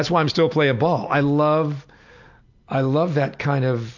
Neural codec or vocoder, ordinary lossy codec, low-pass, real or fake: none; AAC, 48 kbps; 7.2 kHz; real